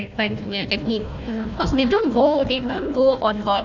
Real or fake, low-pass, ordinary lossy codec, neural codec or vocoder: fake; 7.2 kHz; none; codec, 16 kHz, 1 kbps, FunCodec, trained on Chinese and English, 50 frames a second